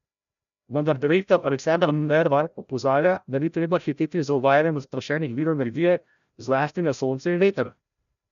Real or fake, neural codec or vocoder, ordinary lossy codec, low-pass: fake; codec, 16 kHz, 0.5 kbps, FreqCodec, larger model; none; 7.2 kHz